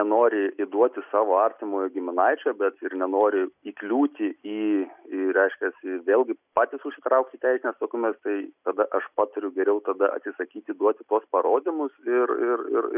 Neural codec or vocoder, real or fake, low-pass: none; real; 3.6 kHz